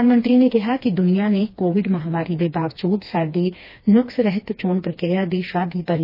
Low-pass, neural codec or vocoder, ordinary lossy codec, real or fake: 5.4 kHz; codec, 16 kHz, 2 kbps, FreqCodec, smaller model; MP3, 24 kbps; fake